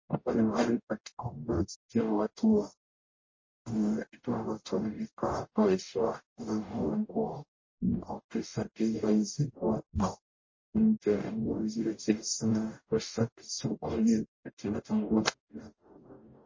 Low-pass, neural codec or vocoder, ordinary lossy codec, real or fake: 7.2 kHz; codec, 44.1 kHz, 0.9 kbps, DAC; MP3, 32 kbps; fake